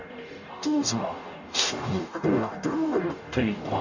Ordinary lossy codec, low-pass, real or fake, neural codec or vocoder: none; 7.2 kHz; fake; codec, 44.1 kHz, 0.9 kbps, DAC